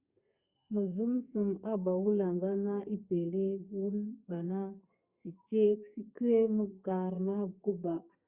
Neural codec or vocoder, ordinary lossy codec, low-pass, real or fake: codec, 32 kHz, 1.9 kbps, SNAC; Opus, 64 kbps; 3.6 kHz; fake